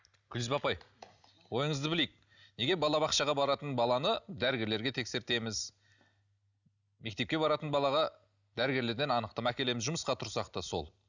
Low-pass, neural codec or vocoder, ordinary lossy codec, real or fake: 7.2 kHz; none; none; real